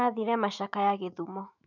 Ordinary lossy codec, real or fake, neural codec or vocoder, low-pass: none; fake; autoencoder, 48 kHz, 128 numbers a frame, DAC-VAE, trained on Japanese speech; 7.2 kHz